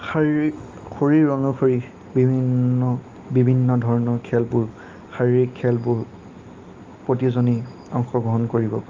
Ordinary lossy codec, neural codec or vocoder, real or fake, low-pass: Opus, 32 kbps; none; real; 7.2 kHz